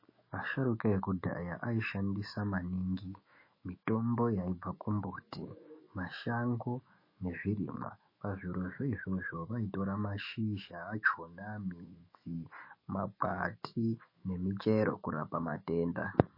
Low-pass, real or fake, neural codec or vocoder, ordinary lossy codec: 5.4 kHz; fake; autoencoder, 48 kHz, 128 numbers a frame, DAC-VAE, trained on Japanese speech; MP3, 24 kbps